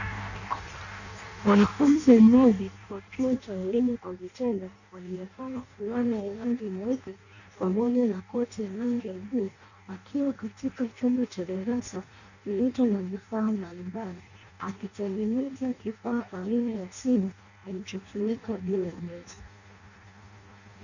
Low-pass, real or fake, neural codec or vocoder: 7.2 kHz; fake; codec, 16 kHz in and 24 kHz out, 0.6 kbps, FireRedTTS-2 codec